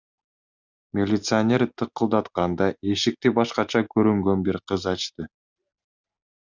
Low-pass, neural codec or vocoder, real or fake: 7.2 kHz; none; real